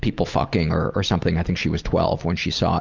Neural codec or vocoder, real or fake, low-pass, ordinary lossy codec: none; real; 7.2 kHz; Opus, 32 kbps